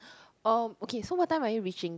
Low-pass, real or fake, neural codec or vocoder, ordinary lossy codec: none; real; none; none